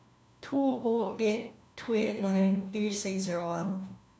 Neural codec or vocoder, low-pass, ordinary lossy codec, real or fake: codec, 16 kHz, 1 kbps, FunCodec, trained on LibriTTS, 50 frames a second; none; none; fake